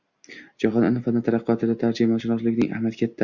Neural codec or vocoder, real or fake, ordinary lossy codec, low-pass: none; real; Opus, 64 kbps; 7.2 kHz